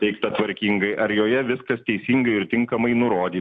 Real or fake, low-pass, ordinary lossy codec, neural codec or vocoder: real; 9.9 kHz; AAC, 64 kbps; none